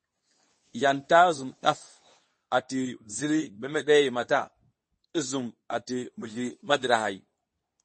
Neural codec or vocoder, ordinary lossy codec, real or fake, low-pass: codec, 24 kHz, 0.9 kbps, WavTokenizer, medium speech release version 2; MP3, 32 kbps; fake; 10.8 kHz